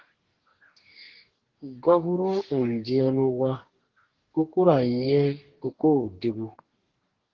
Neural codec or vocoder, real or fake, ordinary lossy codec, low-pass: codec, 44.1 kHz, 2.6 kbps, DAC; fake; Opus, 16 kbps; 7.2 kHz